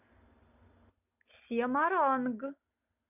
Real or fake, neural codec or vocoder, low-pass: real; none; 3.6 kHz